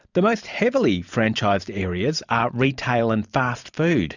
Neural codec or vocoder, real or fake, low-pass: vocoder, 44.1 kHz, 128 mel bands every 512 samples, BigVGAN v2; fake; 7.2 kHz